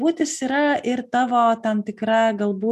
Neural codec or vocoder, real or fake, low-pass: none; real; 14.4 kHz